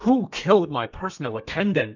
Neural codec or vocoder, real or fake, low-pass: codec, 32 kHz, 1.9 kbps, SNAC; fake; 7.2 kHz